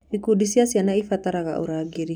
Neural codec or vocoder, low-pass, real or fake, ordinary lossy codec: none; 19.8 kHz; real; none